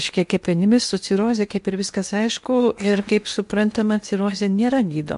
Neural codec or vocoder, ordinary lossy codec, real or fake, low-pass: codec, 16 kHz in and 24 kHz out, 0.8 kbps, FocalCodec, streaming, 65536 codes; MP3, 64 kbps; fake; 10.8 kHz